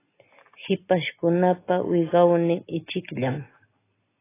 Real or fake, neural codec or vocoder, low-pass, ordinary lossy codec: real; none; 3.6 kHz; AAC, 16 kbps